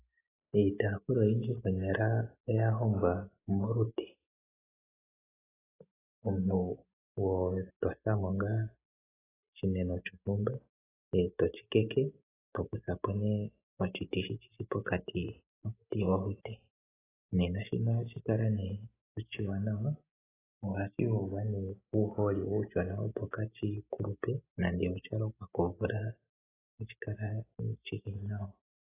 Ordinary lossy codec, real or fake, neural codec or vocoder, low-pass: AAC, 16 kbps; real; none; 3.6 kHz